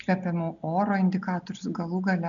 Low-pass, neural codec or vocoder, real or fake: 7.2 kHz; none; real